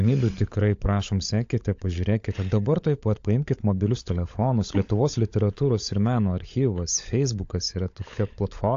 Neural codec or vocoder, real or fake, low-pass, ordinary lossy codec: codec, 16 kHz, 16 kbps, FunCodec, trained on LibriTTS, 50 frames a second; fake; 7.2 kHz; AAC, 48 kbps